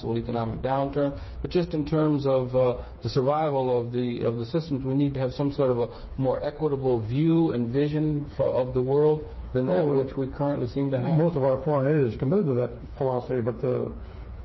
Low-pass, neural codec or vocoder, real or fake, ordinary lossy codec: 7.2 kHz; codec, 16 kHz, 4 kbps, FreqCodec, smaller model; fake; MP3, 24 kbps